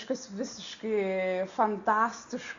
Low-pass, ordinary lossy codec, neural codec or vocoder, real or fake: 7.2 kHz; Opus, 64 kbps; none; real